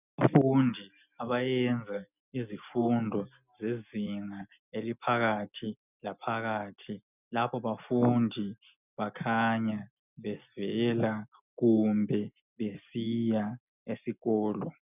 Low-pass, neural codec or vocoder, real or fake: 3.6 kHz; none; real